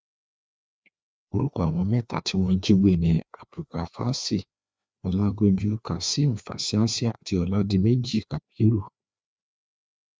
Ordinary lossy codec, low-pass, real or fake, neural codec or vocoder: none; none; fake; codec, 16 kHz, 2 kbps, FreqCodec, larger model